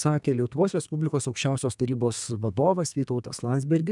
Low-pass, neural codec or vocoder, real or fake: 10.8 kHz; codec, 32 kHz, 1.9 kbps, SNAC; fake